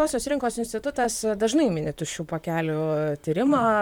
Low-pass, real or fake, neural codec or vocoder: 19.8 kHz; fake; vocoder, 44.1 kHz, 128 mel bands, Pupu-Vocoder